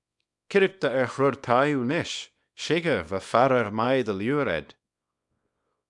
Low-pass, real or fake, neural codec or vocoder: 10.8 kHz; fake; codec, 24 kHz, 0.9 kbps, WavTokenizer, small release